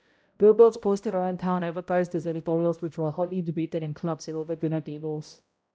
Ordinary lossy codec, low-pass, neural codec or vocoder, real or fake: none; none; codec, 16 kHz, 0.5 kbps, X-Codec, HuBERT features, trained on balanced general audio; fake